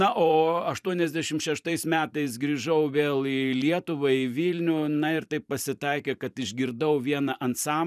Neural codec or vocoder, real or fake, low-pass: vocoder, 44.1 kHz, 128 mel bands every 512 samples, BigVGAN v2; fake; 14.4 kHz